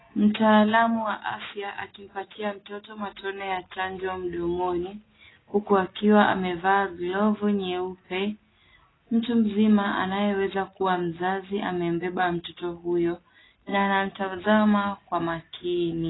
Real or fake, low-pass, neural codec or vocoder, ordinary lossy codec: real; 7.2 kHz; none; AAC, 16 kbps